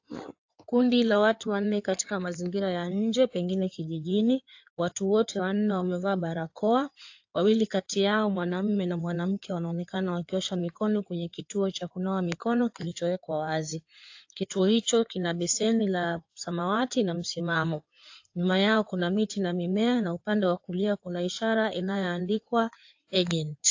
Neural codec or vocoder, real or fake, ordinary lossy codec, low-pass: codec, 16 kHz in and 24 kHz out, 2.2 kbps, FireRedTTS-2 codec; fake; AAC, 48 kbps; 7.2 kHz